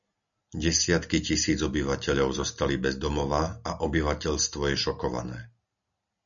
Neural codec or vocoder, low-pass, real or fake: none; 7.2 kHz; real